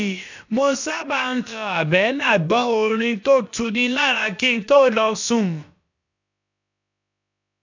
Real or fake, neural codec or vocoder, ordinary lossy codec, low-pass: fake; codec, 16 kHz, about 1 kbps, DyCAST, with the encoder's durations; none; 7.2 kHz